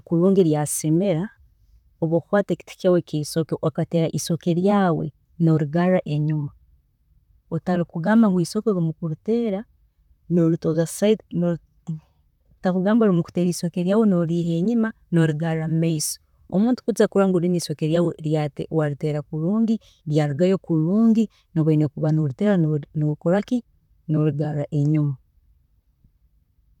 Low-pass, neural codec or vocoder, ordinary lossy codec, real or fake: 19.8 kHz; vocoder, 44.1 kHz, 128 mel bands, Pupu-Vocoder; none; fake